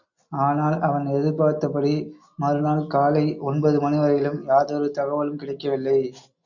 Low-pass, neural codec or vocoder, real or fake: 7.2 kHz; none; real